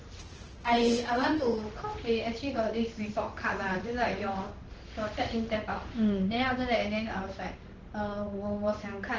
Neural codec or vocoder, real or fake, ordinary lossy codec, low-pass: codec, 16 kHz in and 24 kHz out, 1 kbps, XY-Tokenizer; fake; Opus, 16 kbps; 7.2 kHz